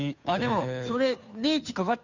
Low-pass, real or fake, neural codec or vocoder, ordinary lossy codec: 7.2 kHz; fake; codec, 16 kHz, 2 kbps, FunCodec, trained on Chinese and English, 25 frames a second; none